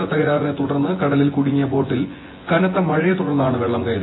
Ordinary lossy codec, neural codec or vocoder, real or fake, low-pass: AAC, 16 kbps; vocoder, 24 kHz, 100 mel bands, Vocos; fake; 7.2 kHz